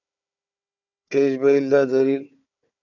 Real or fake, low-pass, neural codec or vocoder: fake; 7.2 kHz; codec, 16 kHz, 4 kbps, FunCodec, trained on Chinese and English, 50 frames a second